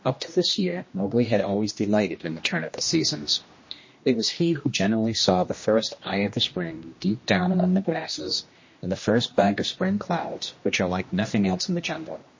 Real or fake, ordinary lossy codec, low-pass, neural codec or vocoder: fake; MP3, 32 kbps; 7.2 kHz; codec, 16 kHz, 1 kbps, X-Codec, HuBERT features, trained on general audio